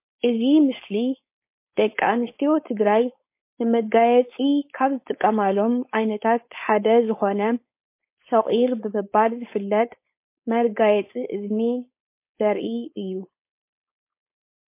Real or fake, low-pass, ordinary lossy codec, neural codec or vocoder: fake; 3.6 kHz; MP3, 24 kbps; codec, 16 kHz, 4.8 kbps, FACodec